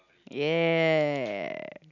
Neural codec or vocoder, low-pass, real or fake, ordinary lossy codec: none; 7.2 kHz; real; none